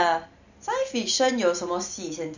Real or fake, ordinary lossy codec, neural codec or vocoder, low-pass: real; none; none; 7.2 kHz